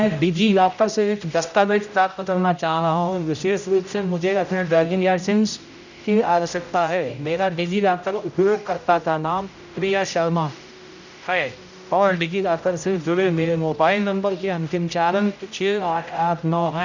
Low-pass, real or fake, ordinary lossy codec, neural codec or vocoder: 7.2 kHz; fake; none; codec, 16 kHz, 0.5 kbps, X-Codec, HuBERT features, trained on general audio